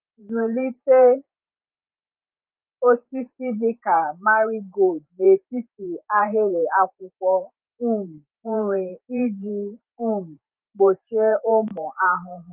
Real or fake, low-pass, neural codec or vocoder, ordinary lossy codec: fake; 3.6 kHz; vocoder, 44.1 kHz, 128 mel bands every 512 samples, BigVGAN v2; Opus, 24 kbps